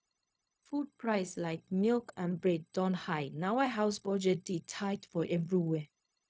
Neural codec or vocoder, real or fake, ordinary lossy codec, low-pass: codec, 16 kHz, 0.4 kbps, LongCat-Audio-Codec; fake; none; none